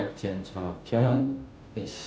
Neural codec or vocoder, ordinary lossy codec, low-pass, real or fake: codec, 16 kHz, 0.5 kbps, FunCodec, trained on Chinese and English, 25 frames a second; none; none; fake